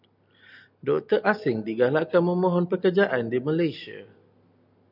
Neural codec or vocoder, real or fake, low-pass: none; real; 5.4 kHz